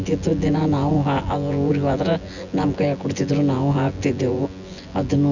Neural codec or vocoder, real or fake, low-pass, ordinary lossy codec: vocoder, 24 kHz, 100 mel bands, Vocos; fake; 7.2 kHz; none